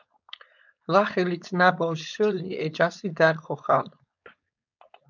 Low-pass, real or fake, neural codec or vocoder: 7.2 kHz; fake; codec, 16 kHz, 4.8 kbps, FACodec